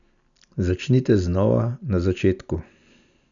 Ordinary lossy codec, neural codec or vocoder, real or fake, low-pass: none; none; real; 7.2 kHz